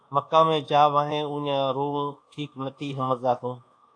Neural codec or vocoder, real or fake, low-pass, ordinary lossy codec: codec, 24 kHz, 1.2 kbps, DualCodec; fake; 9.9 kHz; AAC, 48 kbps